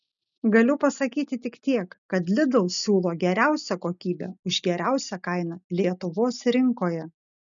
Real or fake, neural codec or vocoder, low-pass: real; none; 7.2 kHz